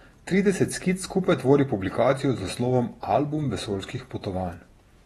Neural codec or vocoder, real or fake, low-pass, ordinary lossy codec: vocoder, 48 kHz, 128 mel bands, Vocos; fake; 19.8 kHz; AAC, 32 kbps